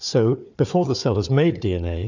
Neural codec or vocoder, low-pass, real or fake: codec, 16 kHz, 4 kbps, FunCodec, trained on Chinese and English, 50 frames a second; 7.2 kHz; fake